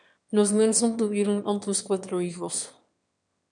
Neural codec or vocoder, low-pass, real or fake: autoencoder, 22.05 kHz, a latent of 192 numbers a frame, VITS, trained on one speaker; 9.9 kHz; fake